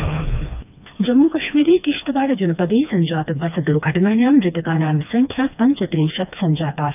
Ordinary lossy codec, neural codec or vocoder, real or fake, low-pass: none; codec, 16 kHz, 2 kbps, FreqCodec, smaller model; fake; 3.6 kHz